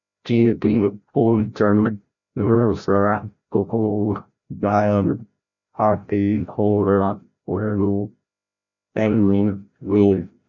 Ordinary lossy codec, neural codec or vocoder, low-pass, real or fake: none; codec, 16 kHz, 0.5 kbps, FreqCodec, larger model; 7.2 kHz; fake